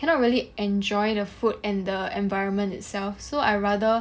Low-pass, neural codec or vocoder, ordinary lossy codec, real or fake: none; none; none; real